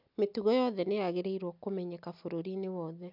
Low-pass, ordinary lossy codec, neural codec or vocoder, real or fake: 5.4 kHz; none; none; real